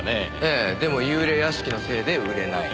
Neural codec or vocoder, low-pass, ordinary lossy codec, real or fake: none; none; none; real